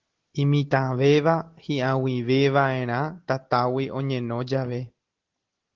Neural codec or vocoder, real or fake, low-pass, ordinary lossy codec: none; real; 7.2 kHz; Opus, 16 kbps